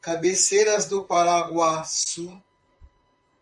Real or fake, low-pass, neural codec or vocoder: fake; 10.8 kHz; vocoder, 44.1 kHz, 128 mel bands, Pupu-Vocoder